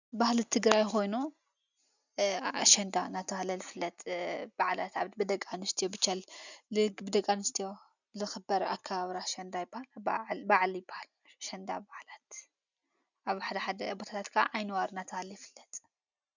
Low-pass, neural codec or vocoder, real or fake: 7.2 kHz; none; real